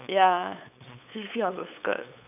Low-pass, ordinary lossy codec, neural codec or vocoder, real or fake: 3.6 kHz; none; codec, 16 kHz, 8 kbps, FunCodec, trained on LibriTTS, 25 frames a second; fake